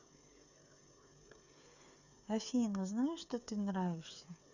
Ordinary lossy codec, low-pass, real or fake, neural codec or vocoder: none; 7.2 kHz; fake; codec, 16 kHz, 8 kbps, FreqCodec, smaller model